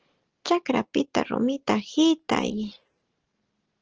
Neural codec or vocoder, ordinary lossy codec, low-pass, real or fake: none; Opus, 16 kbps; 7.2 kHz; real